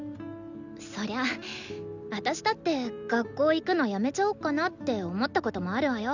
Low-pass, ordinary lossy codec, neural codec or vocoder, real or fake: 7.2 kHz; none; none; real